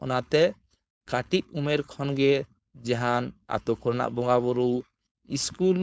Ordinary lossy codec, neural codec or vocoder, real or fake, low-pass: none; codec, 16 kHz, 4.8 kbps, FACodec; fake; none